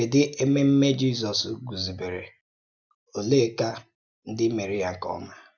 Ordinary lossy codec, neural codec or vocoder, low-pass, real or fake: none; none; 7.2 kHz; real